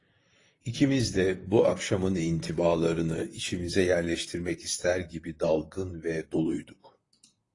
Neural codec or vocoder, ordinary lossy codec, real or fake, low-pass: vocoder, 44.1 kHz, 128 mel bands, Pupu-Vocoder; AAC, 32 kbps; fake; 10.8 kHz